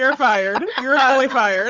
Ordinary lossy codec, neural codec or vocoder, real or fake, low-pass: Opus, 32 kbps; codec, 16 kHz, 16 kbps, FunCodec, trained on Chinese and English, 50 frames a second; fake; 7.2 kHz